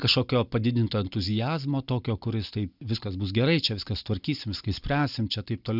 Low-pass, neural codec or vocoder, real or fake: 5.4 kHz; none; real